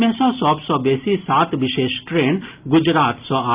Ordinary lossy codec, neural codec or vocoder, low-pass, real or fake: Opus, 24 kbps; none; 3.6 kHz; real